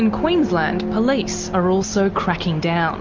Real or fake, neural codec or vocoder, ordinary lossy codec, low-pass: real; none; MP3, 48 kbps; 7.2 kHz